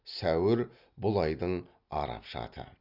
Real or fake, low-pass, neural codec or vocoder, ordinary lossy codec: real; 5.4 kHz; none; none